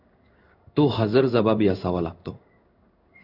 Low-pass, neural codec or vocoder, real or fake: 5.4 kHz; codec, 16 kHz in and 24 kHz out, 1 kbps, XY-Tokenizer; fake